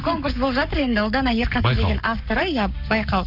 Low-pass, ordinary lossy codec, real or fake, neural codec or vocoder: 5.4 kHz; none; fake; codec, 44.1 kHz, 7.8 kbps, Pupu-Codec